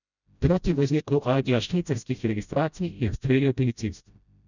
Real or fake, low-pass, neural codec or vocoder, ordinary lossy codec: fake; 7.2 kHz; codec, 16 kHz, 0.5 kbps, FreqCodec, smaller model; none